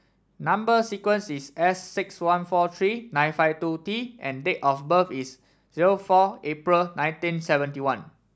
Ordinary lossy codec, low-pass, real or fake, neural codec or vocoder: none; none; real; none